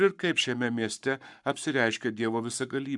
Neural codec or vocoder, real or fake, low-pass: codec, 44.1 kHz, 7.8 kbps, Pupu-Codec; fake; 10.8 kHz